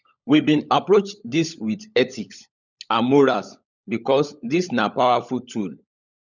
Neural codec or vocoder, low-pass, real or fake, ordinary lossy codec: codec, 16 kHz, 16 kbps, FunCodec, trained on LibriTTS, 50 frames a second; 7.2 kHz; fake; none